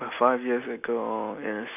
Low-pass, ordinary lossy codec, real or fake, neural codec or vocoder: 3.6 kHz; none; real; none